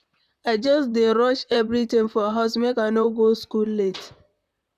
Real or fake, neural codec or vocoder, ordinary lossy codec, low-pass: fake; vocoder, 44.1 kHz, 128 mel bands, Pupu-Vocoder; none; 14.4 kHz